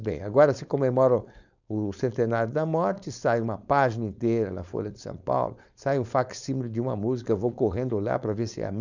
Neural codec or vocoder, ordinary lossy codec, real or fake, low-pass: codec, 16 kHz, 4.8 kbps, FACodec; none; fake; 7.2 kHz